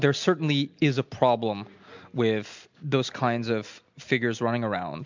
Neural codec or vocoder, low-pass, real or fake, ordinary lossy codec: none; 7.2 kHz; real; MP3, 64 kbps